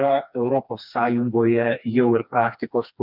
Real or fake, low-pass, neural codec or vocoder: fake; 5.4 kHz; codec, 16 kHz, 2 kbps, FreqCodec, smaller model